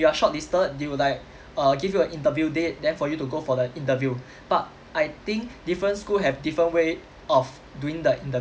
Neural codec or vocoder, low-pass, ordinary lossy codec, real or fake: none; none; none; real